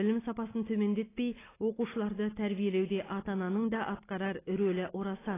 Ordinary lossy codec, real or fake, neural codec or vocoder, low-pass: AAC, 16 kbps; real; none; 3.6 kHz